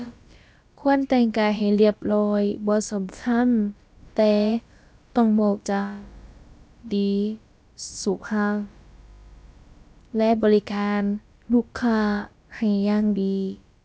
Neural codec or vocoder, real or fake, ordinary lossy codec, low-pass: codec, 16 kHz, about 1 kbps, DyCAST, with the encoder's durations; fake; none; none